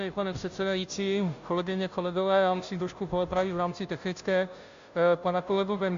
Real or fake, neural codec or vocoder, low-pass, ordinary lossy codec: fake; codec, 16 kHz, 0.5 kbps, FunCodec, trained on Chinese and English, 25 frames a second; 7.2 kHz; AAC, 64 kbps